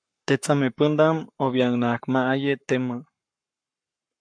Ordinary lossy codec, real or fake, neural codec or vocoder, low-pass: AAC, 64 kbps; fake; codec, 44.1 kHz, 7.8 kbps, Pupu-Codec; 9.9 kHz